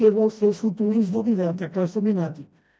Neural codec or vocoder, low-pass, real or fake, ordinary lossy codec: codec, 16 kHz, 1 kbps, FreqCodec, smaller model; none; fake; none